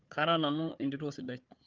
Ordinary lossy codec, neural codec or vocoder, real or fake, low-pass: Opus, 24 kbps; codec, 16 kHz, 4 kbps, FunCodec, trained on Chinese and English, 50 frames a second; fake; 7.2 kHz